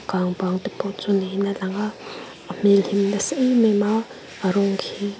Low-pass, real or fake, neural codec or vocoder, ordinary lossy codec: none; real; none; none